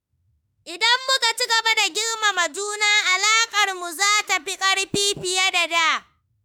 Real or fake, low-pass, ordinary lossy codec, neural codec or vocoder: fake; none; none; autoencoder, 48 kHz, 32 numbers a frame, DAC-VAE, trained on Japanese speech